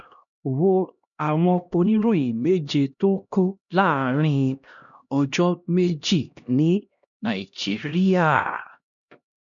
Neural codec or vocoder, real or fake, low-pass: codec, 16 kHz, 1 kbps, X-Codec, HuBERT features, trained on LibriSpeech; fake; 7.2 kHz